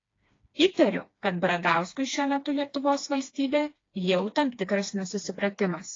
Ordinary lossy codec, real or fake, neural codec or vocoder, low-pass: AAC, 32 kbps; fake; codec, 16 kHz, 2 kbps, FreqCodec, smaller model; 7.2 kHz